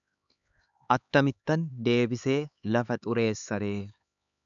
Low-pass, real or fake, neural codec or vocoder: 7.2 kHz; fake; codec, 16 kHz, 4 kbps, X-Codec, HuBERT features, trained on LibriSpeech